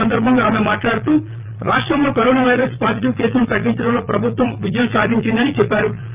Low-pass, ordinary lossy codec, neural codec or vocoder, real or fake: 3.6 kHz; Opus, 24 kbps; vocoder, 22.05 kHz, 80 mel bands, Vocos; fake